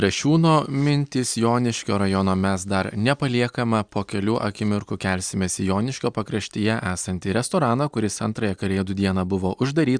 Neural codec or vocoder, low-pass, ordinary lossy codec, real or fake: none; 9.9 kHz; Opus, 64 kbps; real